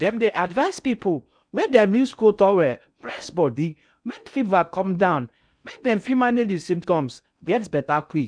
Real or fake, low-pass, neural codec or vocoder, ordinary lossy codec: fake; 9.9 kHz; codec, 16 kHz in and 24 kHz out, 0.6 kbps, FocalCodec, streaming, 4096 codes; none